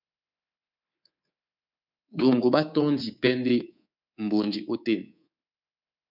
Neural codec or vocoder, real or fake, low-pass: codec, 24 kHz, 3.1 kbps, DualCodec; fake; 5.4 kHz